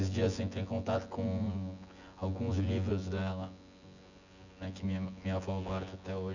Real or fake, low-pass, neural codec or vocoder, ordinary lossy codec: fake; 7.2 kHz; vocoder, 24 kHz, 100 mel bands, Vocos; none